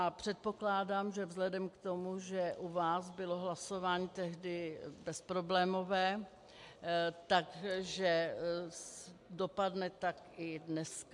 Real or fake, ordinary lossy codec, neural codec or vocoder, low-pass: real; MP3, 64 kbps; none; 10.8 kHz